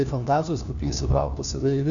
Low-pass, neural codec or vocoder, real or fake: 7.2 kHz; codec, 16 kHz, 1 kbps, FunCodec, trained on LibriTTS, 50 frames a second; fake